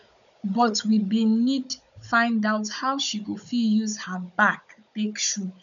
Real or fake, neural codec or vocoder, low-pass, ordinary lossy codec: fake; codec, 16 kHz, 16 kbps, FunCodec, trained on Chinese and English, 50 frames a second; 7.2 kHz; none